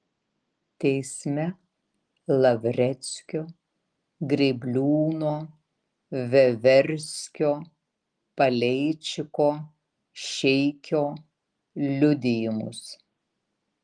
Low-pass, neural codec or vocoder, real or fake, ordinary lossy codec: 9.9 kHz; none; real; Opus, 24 kbps